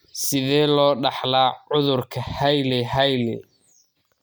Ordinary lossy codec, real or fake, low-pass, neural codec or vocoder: none; real; none; none